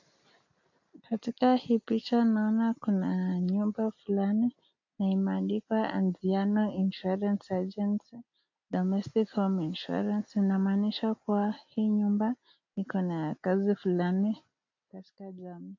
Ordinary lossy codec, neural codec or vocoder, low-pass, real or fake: AAC, 48 kbps; none; 7.2 kHz; real